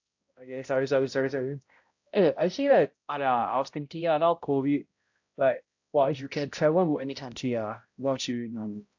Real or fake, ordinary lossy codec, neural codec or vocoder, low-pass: fake; AAC, 48 kbps; codec, 16 kHz, 0.5 kbps, X-Codec, HuBERT features, trained on balanced general audio; 7.2 kHz